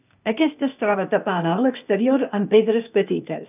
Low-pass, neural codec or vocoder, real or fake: 3.6 kHz; codec, 16 kHz, 0.8 kbps, ZipCodec; fake